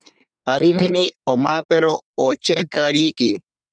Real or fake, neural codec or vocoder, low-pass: fake; codec, 24 kHz, 1 kbps, SNAC; 9.9 kHz